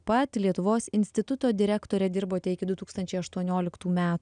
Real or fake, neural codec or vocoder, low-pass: real; none; 9.9 kHz